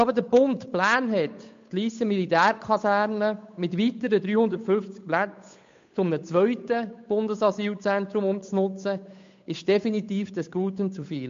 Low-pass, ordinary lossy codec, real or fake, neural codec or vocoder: 7.2 kHz; MP3, 48 kbps; fake; codec, 16 kHz, 8 kbps, FunCodec, trained on Chinese and English, 25 frames a second